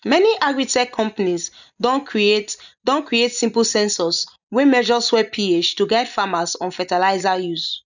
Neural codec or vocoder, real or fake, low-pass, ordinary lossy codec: none; real; 7.2 kHz; none